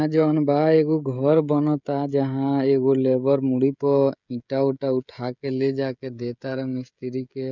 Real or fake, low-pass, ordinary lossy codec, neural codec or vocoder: fake; 7.2 kHz; none; codec, 16 kHz, 16 kbps, FreqCodec, smaller model